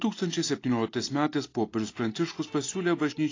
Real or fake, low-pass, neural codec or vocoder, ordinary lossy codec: real; 7.2 kHz; none; AAC, 32 kbps